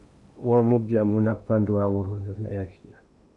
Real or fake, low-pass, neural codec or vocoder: fake; 10.8 kHz; codec, 16 kHz in and 24 kHz out, 0.8 kbps, FocalCodec, streaming, 65536 codes